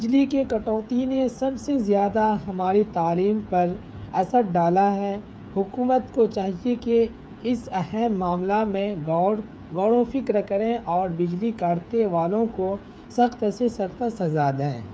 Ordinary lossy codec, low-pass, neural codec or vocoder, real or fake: none; none; codec, 16 kHz, 8 kbps, FreqCodec, smaller model; fake